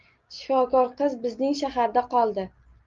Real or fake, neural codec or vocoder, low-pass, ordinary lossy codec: real; none; 7.2 kHz; Opus, 32 kbps